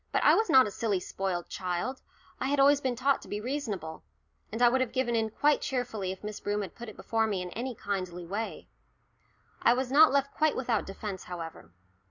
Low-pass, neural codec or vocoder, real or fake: 7.2 kHz; none; real